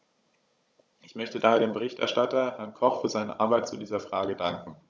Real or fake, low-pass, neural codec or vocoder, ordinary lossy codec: fake; none; codec, 16 kHz, 16 kbps, FunCodec, trained on Chinese and English, 50 frames a second; none